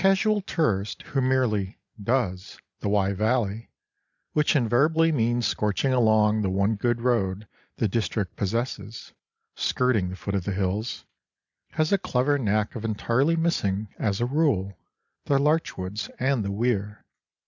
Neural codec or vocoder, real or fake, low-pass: none; real; 7.2 kHz